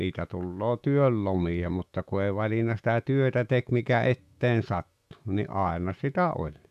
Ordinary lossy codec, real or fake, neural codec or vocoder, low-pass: AAC, 96 kbps; fake; autoencoder, 48 kHz, 128 numbers a frame, DAC-VAE, trained on Japanese speech; 14.4 kHz